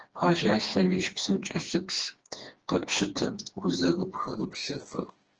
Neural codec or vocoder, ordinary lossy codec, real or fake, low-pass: codec, 16 kHz, 2 kbps, FreqCodec, smaller model; Opus, 16 kbps; fake; 7.2 kHz